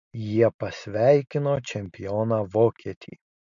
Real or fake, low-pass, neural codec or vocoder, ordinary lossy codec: real; 7.2 kHz; none; MP3, 64 kbps